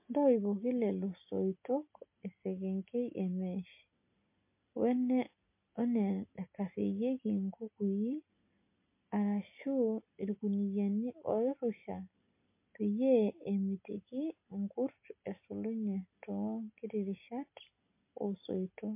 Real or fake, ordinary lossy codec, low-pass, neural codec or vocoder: real; MP3, 24 kbps; 3.6 kHz; none